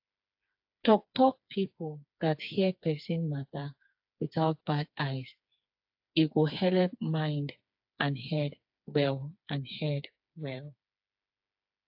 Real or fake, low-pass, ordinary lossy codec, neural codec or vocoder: fake; 5.4 kHz; AAC, 48 kbps; codec, 16 kHz, 4 kbps, FreqCodec, smaller model